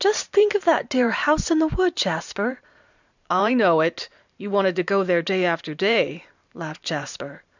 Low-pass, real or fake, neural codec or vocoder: 7.2 kHz; fake; vocoder, 44.1 kHz, 128 mel bands every 512 samples, BigVGAN v2